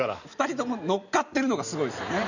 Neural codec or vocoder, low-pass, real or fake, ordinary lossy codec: none; 7.2 kHz; real; none